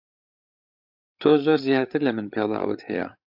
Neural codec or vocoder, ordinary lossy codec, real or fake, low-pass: codec, 16 kHz, 8 kbps, FreqCodec, larger model; AAC, 48 kbps; fake; 5.4 kHz